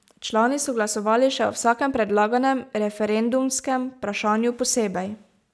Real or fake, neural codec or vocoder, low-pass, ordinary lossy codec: real; none; none; none